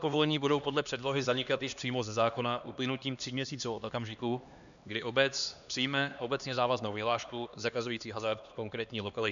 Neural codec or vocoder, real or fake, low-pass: codec, 16 kHz, 2 kbps, X-Codec, HuBERT features, trained on LibriSpeech; fake; 7.2 kHz